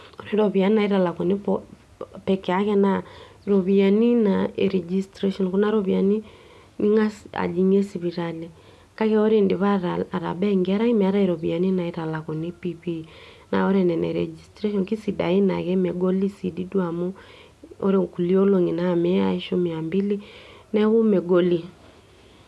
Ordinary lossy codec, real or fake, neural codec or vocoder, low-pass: none; real; none; none